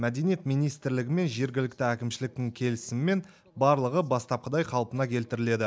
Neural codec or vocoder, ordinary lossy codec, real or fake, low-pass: none; none; real; none